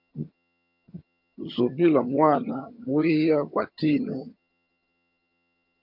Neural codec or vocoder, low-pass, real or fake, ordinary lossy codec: vocoder, 22.05 kHz, 80 mel bands, HiFi-GAN; 5.4 kHz; fake; MP3, 48 kbps